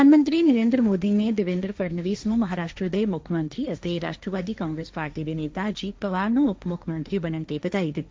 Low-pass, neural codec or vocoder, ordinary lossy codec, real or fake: none; codec, 16 kHz, 1.1 kbps, Voila-Tokenizer; none; fake